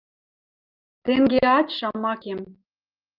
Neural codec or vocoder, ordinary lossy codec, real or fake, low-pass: none; Opus, 32 kbps; real; 5.4 kHz